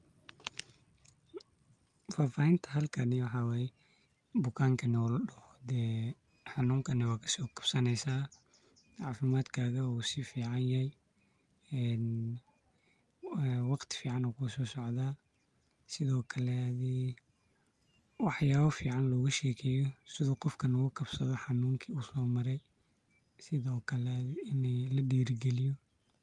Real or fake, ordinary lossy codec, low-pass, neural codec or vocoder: real; Opus, 32 kbps; 10.8 kHz; none